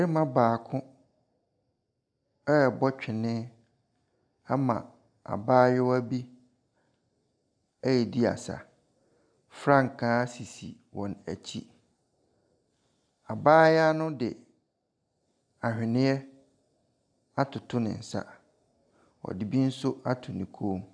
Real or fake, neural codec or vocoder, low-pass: real; none; 9.9 kHz